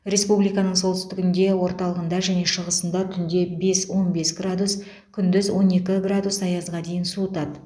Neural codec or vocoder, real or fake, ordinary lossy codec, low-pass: none; real; none; none